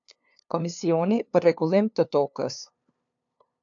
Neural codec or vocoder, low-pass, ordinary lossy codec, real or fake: codec, 16 kHz, 2 kbps, FunCodec, trained on LibriTTS, 25 frames a second; 7.2 kHz; AAC, 64 kbps; fake